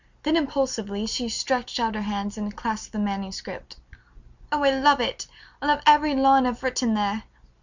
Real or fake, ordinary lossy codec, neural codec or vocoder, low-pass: real; Opus, 64 kbps; none; 7.2 kHz